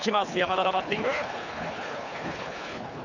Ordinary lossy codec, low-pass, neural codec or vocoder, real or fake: none; 7.2 kHz; codec, 24 kHz, 3 kbps, HILCodec; fake